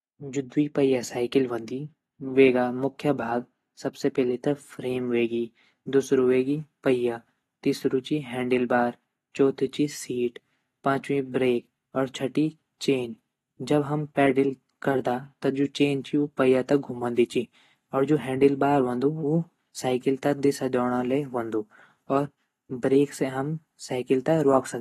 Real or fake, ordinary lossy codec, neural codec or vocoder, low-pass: real; AAC, 32 kbps; none; 14.4 kHz